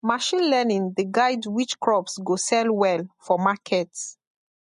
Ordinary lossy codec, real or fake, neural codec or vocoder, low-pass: MP3, 48 kbps; real; none; 14.4 kHz